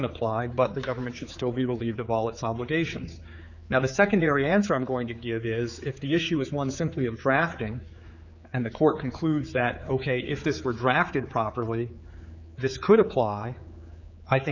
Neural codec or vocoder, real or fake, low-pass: codec, 16 kHz, 4 kbps, X-Codec, HuBERT features, trained on general audio; fake; 7.2 kHz